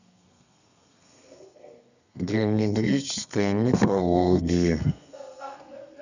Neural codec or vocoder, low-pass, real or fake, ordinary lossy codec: codec, 32 kHz, 1.9 kbps, SNAC; 7.2 kHz; fake; none